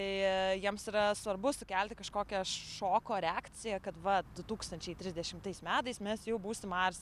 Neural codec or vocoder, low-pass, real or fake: none; 14.4 kHz; real